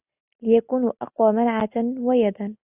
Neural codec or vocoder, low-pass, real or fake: none; 3.6 kHz; real